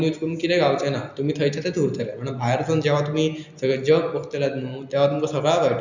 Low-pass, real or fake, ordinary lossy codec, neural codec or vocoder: 7.2 kHz; real; none; none